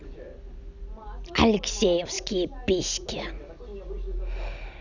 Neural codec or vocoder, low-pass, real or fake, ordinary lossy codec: none; 7.2 kHz; real; none